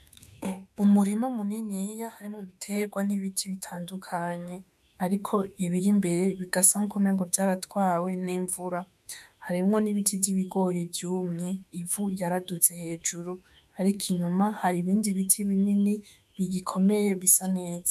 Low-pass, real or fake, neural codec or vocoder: 14.4 kHz; fake; autoencoder, 48 kHz, 32 numbers a frame, DAC-VAE, trained on Japanese speech